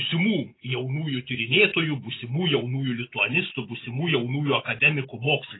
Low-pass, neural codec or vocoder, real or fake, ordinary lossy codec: 7.2 kHz; none; real; AAC, 16 kbps